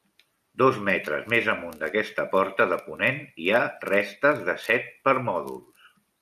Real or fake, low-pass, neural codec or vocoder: real; 14.4 kHz; none